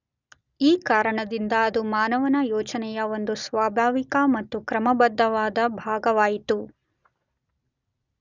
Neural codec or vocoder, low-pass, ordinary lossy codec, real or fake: none; 7.2 kHz; none; real